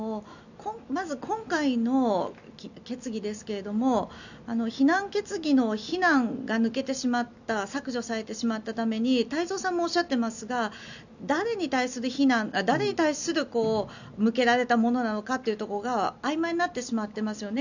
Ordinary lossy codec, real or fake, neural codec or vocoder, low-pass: none; real; none; 7.2 kHz